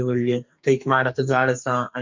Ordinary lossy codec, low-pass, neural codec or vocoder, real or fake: MP3, 48 kbps; 7.2 kHz; codec, 44.1 kHz, 2.6 kbps, SNAC; fake